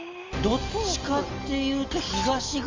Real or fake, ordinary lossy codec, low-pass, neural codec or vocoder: real; Opus, 32 kbps; 7.2 kHz; none